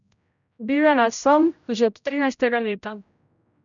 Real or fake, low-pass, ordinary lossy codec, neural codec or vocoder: fake; 7.2 kHz; none; codec, 16 kHz, 0.5 kbps, X-Codec, HuBERT features, trained on general audio